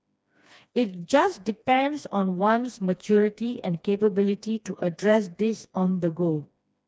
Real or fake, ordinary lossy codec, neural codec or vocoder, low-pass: fake; none; codec, 16 kHz, 2 kbps, FreqCodec, smaller model; none